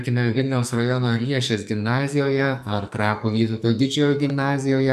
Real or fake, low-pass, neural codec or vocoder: fake; 14.4 kHz; codec, 44.1 kHz, 2.6 kbps, SNAC